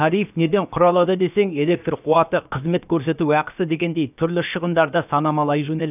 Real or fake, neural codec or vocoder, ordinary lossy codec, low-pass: fake; codec, 16 kHz, about 1 kbps, DyCAST, with the encoder's durations; none; 3.6 kHz